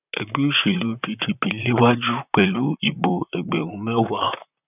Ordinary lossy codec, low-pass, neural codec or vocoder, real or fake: none; 3.6 kHz; vocoder, 44.1 kHz, 128 mel bands, Pupu-Vocoder; fake